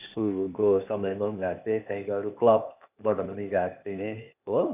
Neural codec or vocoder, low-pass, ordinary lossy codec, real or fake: codec, 16 kHz, 0.8 kbps, ZipCodec; 3.6 kHz; none; fake